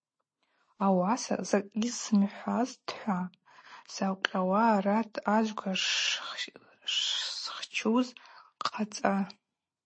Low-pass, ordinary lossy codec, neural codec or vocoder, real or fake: 9.9 kHz; MP3, 32 kbps; none; real